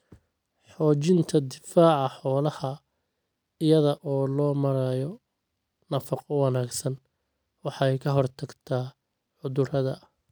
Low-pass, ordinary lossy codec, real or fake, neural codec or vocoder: none; none; real; none